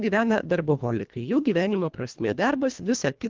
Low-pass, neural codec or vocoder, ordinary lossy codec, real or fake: 7.2 kHz; codec, 24 kHz, 1.5 kbps, HILCodec; Opus, 32 kbps; fake